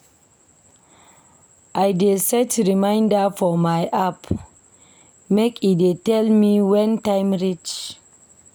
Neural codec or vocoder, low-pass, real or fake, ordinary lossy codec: none; none; real; none